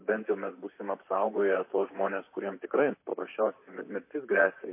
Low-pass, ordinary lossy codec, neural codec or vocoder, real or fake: 3.6 kHz; MP3, 24 kbps; vocoder, 44.1 kHz, 128 mel bands, Pupu-Vocoder; fake